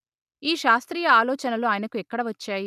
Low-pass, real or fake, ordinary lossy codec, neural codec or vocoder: 14.4 kHz; real; none; none